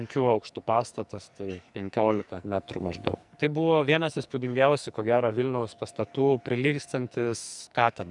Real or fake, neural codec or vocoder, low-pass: fake; codec, 44.1 kHz, 2.6 kbps, SNAC; 10.8 kHz